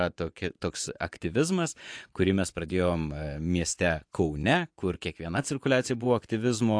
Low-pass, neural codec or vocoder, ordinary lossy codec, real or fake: 9.9 kHz; vocoder, 24 kHz, 100 mel bands, Vocos; AAC, 64 kbps; fake